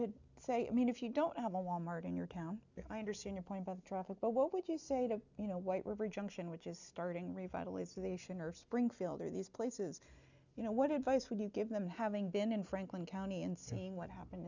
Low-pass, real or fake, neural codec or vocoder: 7.2 kHz; real; none